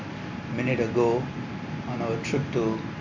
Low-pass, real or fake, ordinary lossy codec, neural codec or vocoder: 7.2 kHz; real; MP3, 48 kbps; none